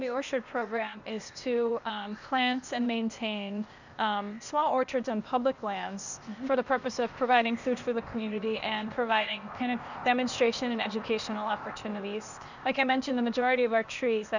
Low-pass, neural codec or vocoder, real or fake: 7.2 kHz; codec, 16 kHz, 0.8 kbps, ZipCodec; fake